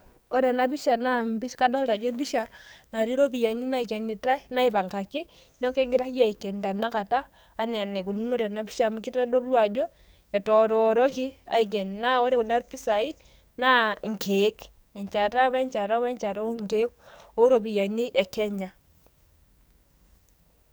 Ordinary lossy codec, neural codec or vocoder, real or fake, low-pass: none; codec, 44.1 kHz, 2.6 kbps, SNAC; fake; none